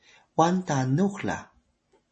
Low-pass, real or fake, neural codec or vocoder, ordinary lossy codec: 10.8 kHz; real; none; MP3, 32 kbps